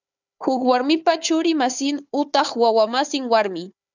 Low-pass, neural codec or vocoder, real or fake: 7.2 kHz; codec, 16 kHz, 4 kbps, FunCodec, trained on Chinese and English, 50 frames a second; fake